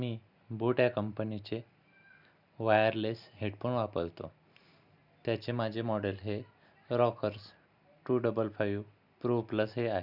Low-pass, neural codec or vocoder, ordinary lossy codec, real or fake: 5.4 kHz; none; none; real